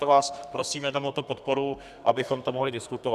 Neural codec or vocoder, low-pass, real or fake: codec, 32 kHz, 1.9 kbps, SNAC; 14.4 kHz; fake